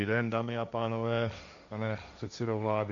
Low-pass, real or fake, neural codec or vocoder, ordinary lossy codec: 7.2 kHz; fake; codec, 16 kHz, 1.1 kbps, Voila-Tokenizer; MP3, 64 kbps